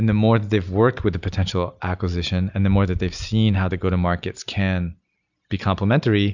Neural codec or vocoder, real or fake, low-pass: none; real; 7.2 kHz